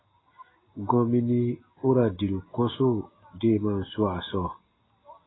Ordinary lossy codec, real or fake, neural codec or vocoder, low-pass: AAC, 16 kbps; real; none; 7.2 kHz